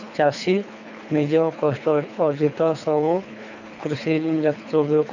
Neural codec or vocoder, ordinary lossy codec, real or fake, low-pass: codec, 24 kHz, 3 kbps, HILCodec; none; fake; 7.2 kHz